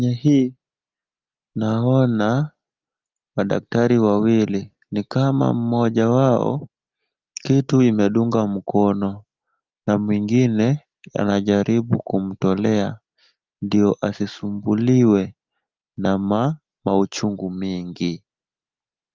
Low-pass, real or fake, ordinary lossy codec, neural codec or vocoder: 7.2 kHz; real; Opus, 32 kbps; none